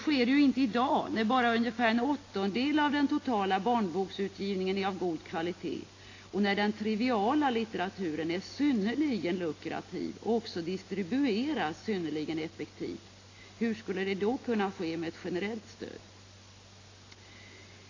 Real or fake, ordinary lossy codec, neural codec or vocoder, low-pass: real; AAC, 32 kbps; none; 7.2 kHz